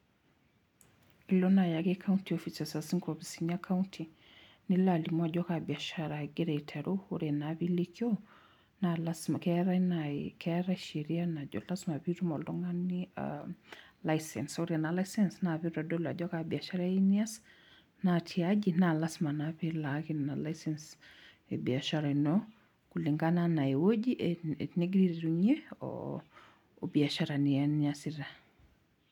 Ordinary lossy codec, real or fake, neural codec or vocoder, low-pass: none; real; none; 19.8 kHz